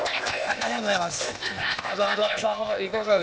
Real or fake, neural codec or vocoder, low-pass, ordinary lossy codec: fake; codec, 16 kHz, 0.8 kbps, ZipCodec; none; none